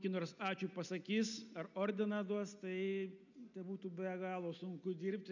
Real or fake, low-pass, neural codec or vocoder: real; 7.2 kHz; none